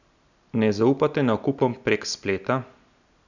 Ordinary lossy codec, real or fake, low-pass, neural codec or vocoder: none; real; 7.2 kHz; none